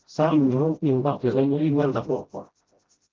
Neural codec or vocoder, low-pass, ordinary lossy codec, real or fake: codec, 16 kHz, 0.5 kbps, FreqCodec, smaller model; 7.2 kHz; Opus, 16 kbps; fake